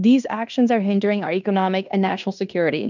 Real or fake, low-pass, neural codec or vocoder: fake; 7.2 kHz; codec, 16 kHz in and 24 kHz out, 0.9 kbps, LongCat-Audio-Codec, fine tuned four codebook decoder